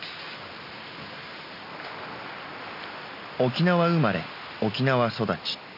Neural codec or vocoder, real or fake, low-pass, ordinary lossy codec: none; real; 5.4 kHz; none